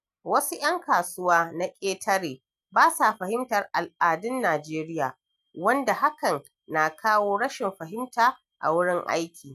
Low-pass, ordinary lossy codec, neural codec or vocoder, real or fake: 14.4 kHz; none; none; real